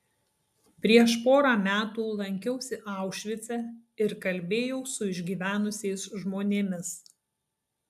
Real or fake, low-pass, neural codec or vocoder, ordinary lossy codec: real; 14.4 kHz; none; AAC, 96 kbps